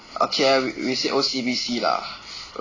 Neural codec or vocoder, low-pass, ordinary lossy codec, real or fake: none; 7.2 kHz; AAC, 32 kbps; real